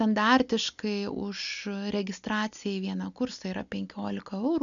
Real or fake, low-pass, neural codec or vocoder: real; 7.2 kHz; none